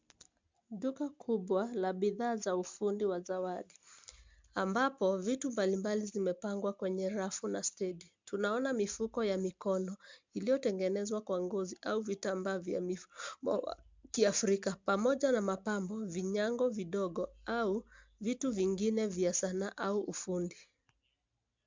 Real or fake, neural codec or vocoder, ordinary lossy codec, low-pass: real; none; MP3, 64 kbps; 7.2 kHz